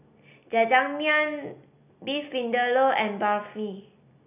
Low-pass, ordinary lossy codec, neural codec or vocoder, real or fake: 3.6 kHz; none; none; real